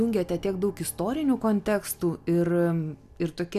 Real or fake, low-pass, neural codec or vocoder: real; 14.4 kHz; none